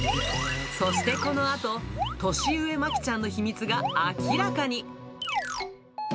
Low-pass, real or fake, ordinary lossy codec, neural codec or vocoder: none; real; none; none